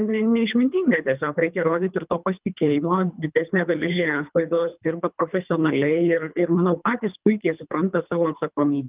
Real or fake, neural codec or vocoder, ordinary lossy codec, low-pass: fake; codec, 24 kHz, 3 kbps, HILCodec; Opus, 24 kbps; 3.6 kHz